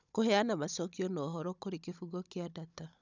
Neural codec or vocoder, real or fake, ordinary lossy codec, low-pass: codec, 16 kHz, 16 kbps, FunCodec, trained on Chinese and English, 50 frames a second; fake; none; 7.2 kHz